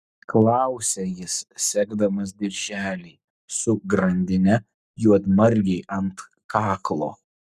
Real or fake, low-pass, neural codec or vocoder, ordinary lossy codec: fake; 14.4 kHz; codec, 44.1 kHz, 7.8 kbps, Pupu-Codec; Opus, 64 kbps